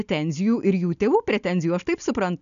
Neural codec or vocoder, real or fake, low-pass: none; real; 7.2 kHz